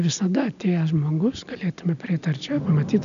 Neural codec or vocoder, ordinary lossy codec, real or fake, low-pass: none; MP3, 96 kbps; real; 7.2 kHz